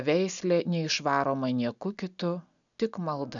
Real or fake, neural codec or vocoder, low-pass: real; none; 7.2 kHz